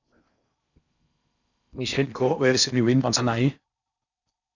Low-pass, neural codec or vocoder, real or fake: 7.2 kHz; codec, 16 kHz in and 24 kHz out, 0.6 kbps, FocalCodec, streaming, 4096 codes; fake